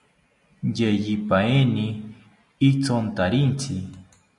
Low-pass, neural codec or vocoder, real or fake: 10.8 kHz; none; real